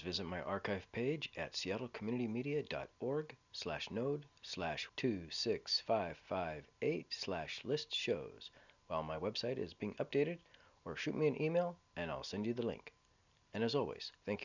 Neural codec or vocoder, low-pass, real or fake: none; 7.2 kHz; real